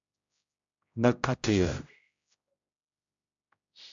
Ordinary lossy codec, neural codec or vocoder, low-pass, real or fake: MP3, 64 kbps; codec, 16 kHz, 0.5 kbps, X-Codec, HuBERT features, trained on general audio; 7.2 kHz; fake